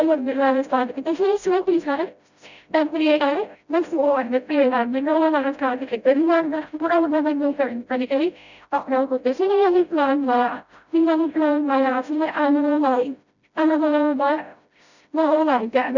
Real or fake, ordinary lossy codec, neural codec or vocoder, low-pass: fake; none; codec, 16 kHz, 0.5 kbps, FreqCodec, smaller model; 7.2 kHz